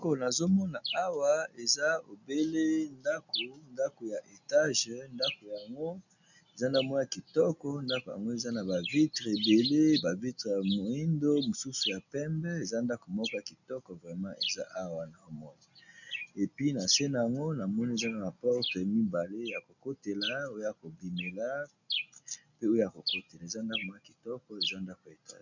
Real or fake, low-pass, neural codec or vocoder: real; 7.2 kHz; none